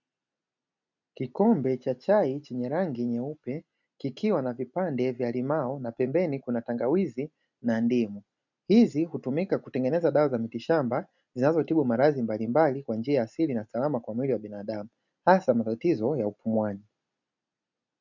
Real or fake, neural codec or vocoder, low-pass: real; none; 7.2 kHz